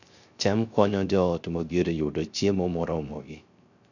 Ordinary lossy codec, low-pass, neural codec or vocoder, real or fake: none; 7.2 kHz; codec, 16 kHz, 0.3 kbps, FocalCodec; fake